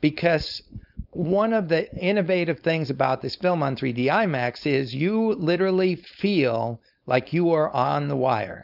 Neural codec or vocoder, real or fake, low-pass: codec, 16 kHz, 4.8 kbps, FACodec; fake; 5.4 kHz